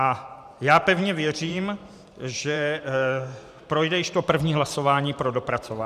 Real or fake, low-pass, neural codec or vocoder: fake; 14.4 kHz; vocoder, 44.1 kHz, 128 mel bands every 512 samples, BigVGAN v2